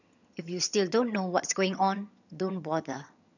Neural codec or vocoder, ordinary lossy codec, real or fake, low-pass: vocoder, 22.05 kHz, 80 mel bands, HiFi-GAN; none; fake; 7.2 kHz